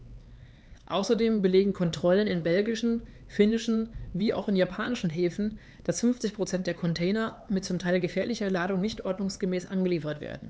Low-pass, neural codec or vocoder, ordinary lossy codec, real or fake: none; codec, 16 kHz, 4 kbps, X-Codec, HuBERT features, trained on LibriSpeech; none; fake